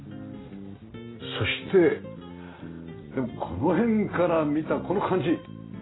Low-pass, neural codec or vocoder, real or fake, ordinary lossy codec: 7.2 kHz; none; real; AAC, 16 kbps